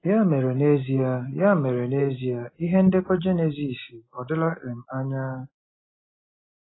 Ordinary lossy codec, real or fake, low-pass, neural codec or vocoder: AAC, 16 kbps; real; 7.2 kHz; none